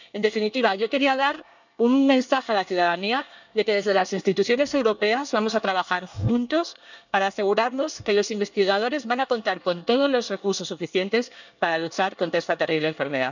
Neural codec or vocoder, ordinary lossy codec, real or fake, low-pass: codec, 24 kHz, 1 kbps, SNAC; none; fake; 7.2 kHz